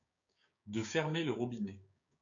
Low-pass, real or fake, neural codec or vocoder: 7.2 kHz; fake; codec, 16 kHz, 6 kbps, DAC